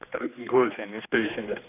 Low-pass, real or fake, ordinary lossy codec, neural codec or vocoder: 3.6 kHz; fake; none; codec, 16 kHz, 2 kbps, X-Codec, HuBERT features, trained on general audio